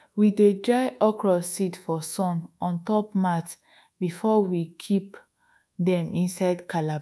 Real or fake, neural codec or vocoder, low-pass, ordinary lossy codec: fake; codec, 24 kHz, 1.2 kbps, DualCodec; none; none